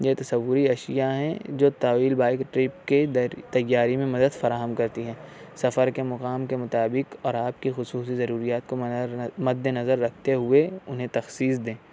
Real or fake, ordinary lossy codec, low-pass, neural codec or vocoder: real; none; none; none